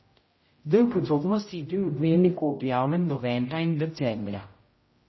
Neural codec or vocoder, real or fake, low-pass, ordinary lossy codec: codec, 16 kHz, 0.5 kbps, X-Codec, HuBERT features, trained on general audio; fake; 7.2 kHz; MP3, 24 kbps